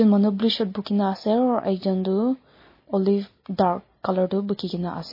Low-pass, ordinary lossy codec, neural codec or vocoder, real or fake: 5.4 kHz; MP3, 24 kbps; none; real